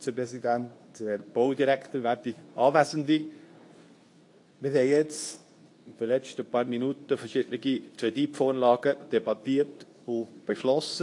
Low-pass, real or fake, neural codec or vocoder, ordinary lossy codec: 10.8 kHz; fake; codec, 24 kHz, 0.9 kbps, WavTokenizer, medium speech release version 2; AAC, 48 kbps